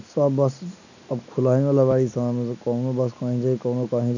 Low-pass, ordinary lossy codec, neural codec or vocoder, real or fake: 7.2 kHz; none; none; real